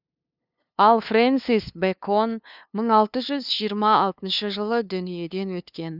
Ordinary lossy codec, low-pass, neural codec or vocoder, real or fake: none; 5.4 kHz; codec, 16 kHz, 2 kbps, FunCodec, trained on LibriTTS, 25 frames a second; fake